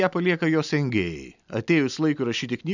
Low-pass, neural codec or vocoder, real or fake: 7.2 kHz; none; real